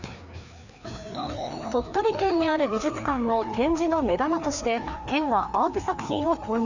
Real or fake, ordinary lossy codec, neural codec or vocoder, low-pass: fake; none; codec, 16 kHz, 2 kbps, FreqCodec, larger model; 7.2 kHz